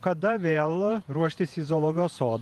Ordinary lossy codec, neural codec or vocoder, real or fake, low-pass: Opus, 32 kbps; vocoder, 48 kHz, 128 mel bands, Vocos; fake; 14.4 kHz